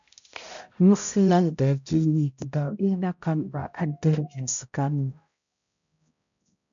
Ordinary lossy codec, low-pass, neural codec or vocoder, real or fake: MP3, 64 kbps; 7.2 kHz; codec, 16 kHz, 0.5 kbps, X-Codec, HuBERT features, trained on balanced general audio; fake